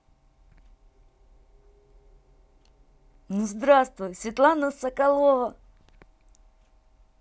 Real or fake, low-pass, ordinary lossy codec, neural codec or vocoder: real; none; none; none